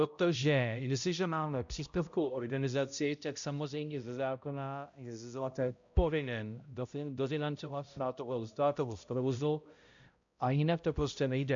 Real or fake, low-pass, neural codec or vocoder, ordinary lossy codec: fake; 7.2 kHz; codec, 16 kHz, 0.5 kbps, X-Codec, HuBERT features, trained on balanced general audio; MP3, 64 kbps